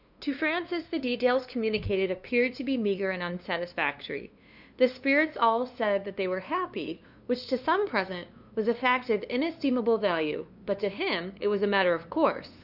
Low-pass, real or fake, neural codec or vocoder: 5.4 kHz; fake; codec, 16 kHz, 2 kbps, FunCodec, trained on LibriTTS, 25 frames a second